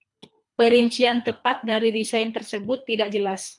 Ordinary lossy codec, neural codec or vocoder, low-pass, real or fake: MP3, 96 kbps; codec, 24 kHz, 3 kbps, HILCodec; 10.8 kHz; fake